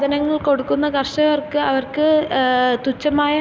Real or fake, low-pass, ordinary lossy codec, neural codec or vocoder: real; none; none; none